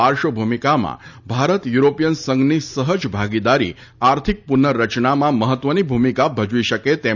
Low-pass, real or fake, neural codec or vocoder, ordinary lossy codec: 7.2 kHz; real; none; none